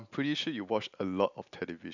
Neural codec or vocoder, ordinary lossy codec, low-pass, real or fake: none; none; 7.2 kHz; real